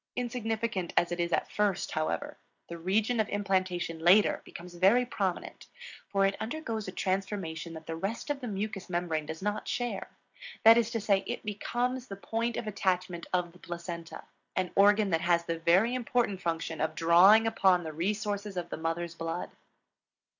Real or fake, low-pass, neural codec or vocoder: real; 7.2 kHz; none